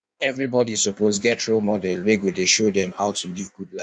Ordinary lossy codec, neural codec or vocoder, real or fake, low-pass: none; codec, 16 kHz in and 24 kHz out, 1.1 kbps, FireRedTTS-2 codec; fake; 9.9 kHz